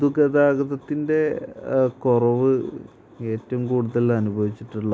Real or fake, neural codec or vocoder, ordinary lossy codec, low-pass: real; none; none; none